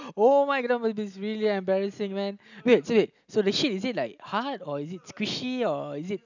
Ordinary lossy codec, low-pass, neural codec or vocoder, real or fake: none; 7.2 kHz; none; real